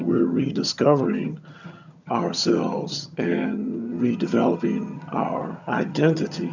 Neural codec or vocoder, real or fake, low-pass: vocoder, 22.05 kHz, 80 mel bands, HiFi-GAN; fake; 7.2 kHz